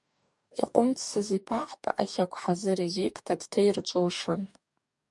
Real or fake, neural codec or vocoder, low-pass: fake; codec, 44.1 kHz, 2.6 kbps, DAC; 10.8 kHz